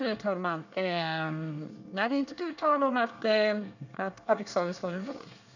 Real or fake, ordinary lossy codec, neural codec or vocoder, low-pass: fake; none; codec, 24 kHz, 1 kbps, SNAC; 7.2 kHz